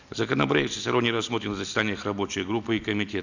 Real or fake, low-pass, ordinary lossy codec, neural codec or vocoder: real; 7.2 kHz; none; none